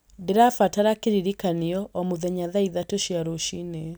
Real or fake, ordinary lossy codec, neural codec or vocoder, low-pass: real; none; none; none